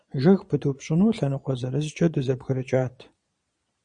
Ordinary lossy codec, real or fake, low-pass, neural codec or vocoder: Opus, 64 kbps; fake; 9.9 kHz; vocoder, 22.05 kHz, 80 mel bands, Vocos